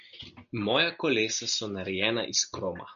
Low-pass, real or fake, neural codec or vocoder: 7.2 kHz; real; none